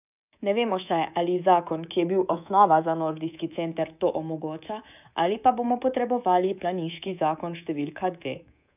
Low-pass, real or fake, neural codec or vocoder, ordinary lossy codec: 3.6 kHz; fake; codec, 24 kHz, 3.1 kbps, DualCodec; none